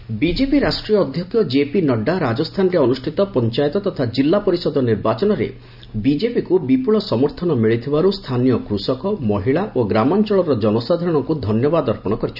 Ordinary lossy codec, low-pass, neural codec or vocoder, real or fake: none; 5.4 kHz; none; real